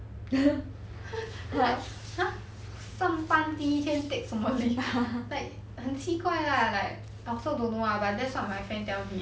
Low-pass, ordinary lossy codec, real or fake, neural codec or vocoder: none; none; real; none